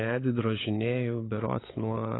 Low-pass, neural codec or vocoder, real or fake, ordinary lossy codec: 7.2 kHz; codec, 16 kHz, 16 kbps, FunCodec, trained on LibriTTS, 50 frames a second; fake; AAC, 16 kbps